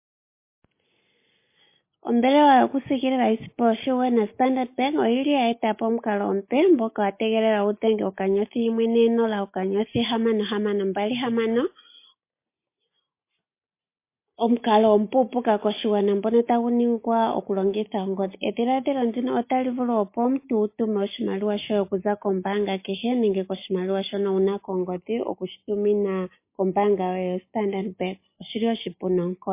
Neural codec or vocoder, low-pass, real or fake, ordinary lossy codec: none; 3.6 kHz; real; MP3, 24 kbps